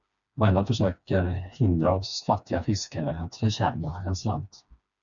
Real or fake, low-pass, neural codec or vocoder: fake; 7.2 kHz; codec, 16 kHz, 2 kbps, FreqCodec, smaller model